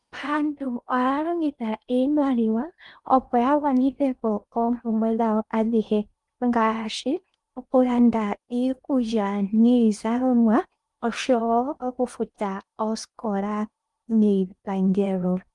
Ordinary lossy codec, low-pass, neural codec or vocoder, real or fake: Opus, 32 kbps; 10.8 kHz; codec, 16 kHz in and 24 kHz out, 0.8 kbps, FocalCodec, streaming, 65536 codes; fake